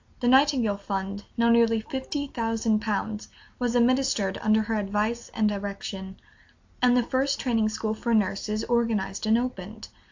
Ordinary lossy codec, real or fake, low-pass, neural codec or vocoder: AAC, 48 kbps; real; 7.2 kHz; none